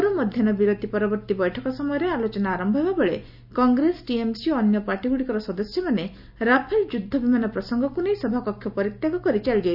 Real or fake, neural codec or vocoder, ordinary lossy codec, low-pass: real; none; none; 5.4 kHz